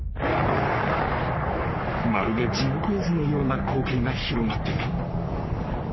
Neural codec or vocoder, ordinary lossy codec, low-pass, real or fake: codec, 44.1 kHz, 3.4 kbps, Pupu-Codec; MP3, 24 kbps; 7.2 kHz; fake